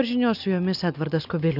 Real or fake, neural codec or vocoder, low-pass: real; none; 5.4 kHz